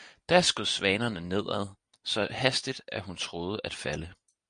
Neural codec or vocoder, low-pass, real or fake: none; 9.9 kHz; real